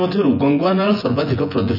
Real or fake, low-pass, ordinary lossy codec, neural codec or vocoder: fake; 5.4 kHz; none; vocoder, 24 kHz, 100 mel bands, Vocos